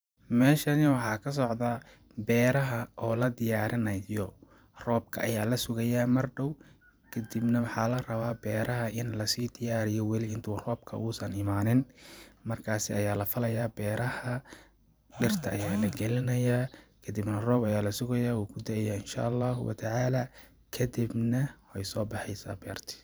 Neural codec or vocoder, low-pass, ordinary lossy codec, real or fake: vocoder, 44.1 kHz, 128 mel bands every 512 samples, BigVGAN v2; none; none; fake